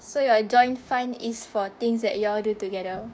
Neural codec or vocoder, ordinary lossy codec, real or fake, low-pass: codec, 16 kHz, 6 kbps, DAC; none; fake; none